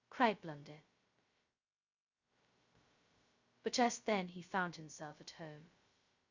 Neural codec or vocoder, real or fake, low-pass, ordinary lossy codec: codec, 16 kHz, 0.2 kbps, FocalCodec; fake; 7.2 kHz; Opus, 64 kbps